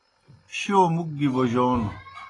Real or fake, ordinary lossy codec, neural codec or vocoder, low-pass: real; AAC, 32 kbps; none; 10.8 kHz